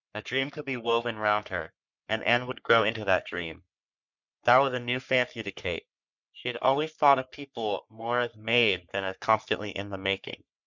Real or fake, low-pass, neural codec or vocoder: fake; 7.2 kHz; codec, 44.1 kHz, 3.4 kbps, Pupu-Codec